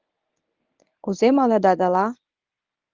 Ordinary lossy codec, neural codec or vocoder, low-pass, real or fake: Opus, 24 kbps; codec, 24 kHz, 0.9 kbps, WavTokenizer, medium speech release version 1; 7.2 kHz; fake